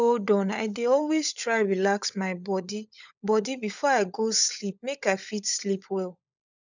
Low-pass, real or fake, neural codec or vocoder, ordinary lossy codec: 7.2 kHz; fake; codec, 16 kHz, 16 kbps, FunCodec, trained on LibriTTS, 50 frames a second; none